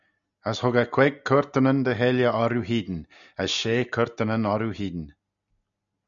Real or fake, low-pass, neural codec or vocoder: real; 7.2 kHz; none